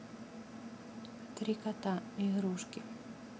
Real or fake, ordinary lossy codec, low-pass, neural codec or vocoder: real; none; none; none